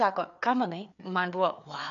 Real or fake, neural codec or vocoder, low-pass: fake; codec, 16 kHz, 4 kbps, FreqCodec, larger model; 7.2 kHz